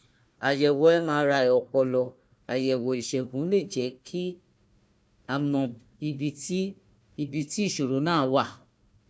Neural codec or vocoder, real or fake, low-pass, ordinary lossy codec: codec, 16 kHz, 1 kbps, FunCodec, trained on Chinese and English, 50 frames a second; fake; none; none